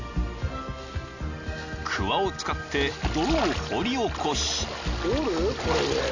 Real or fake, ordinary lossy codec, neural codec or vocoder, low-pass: real; none; none; 7.2 kHz